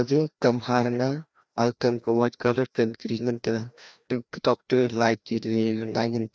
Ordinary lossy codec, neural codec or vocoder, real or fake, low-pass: none; codec, 16 kHz, 1 kbps, FreqCodec, larger model; fake; none